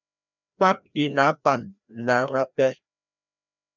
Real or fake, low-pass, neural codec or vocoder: fake; 7.2 kHz; codec, 16 kHz, 1 kbps, FreqCodec, larger model